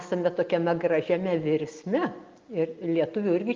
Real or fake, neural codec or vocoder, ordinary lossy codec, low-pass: real; none; Opus, 24 kbps; 7.2 kHz